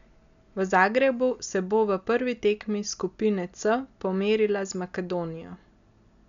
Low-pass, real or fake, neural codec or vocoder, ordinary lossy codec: 7.2 kHz; real; none; MP3, 96 kbps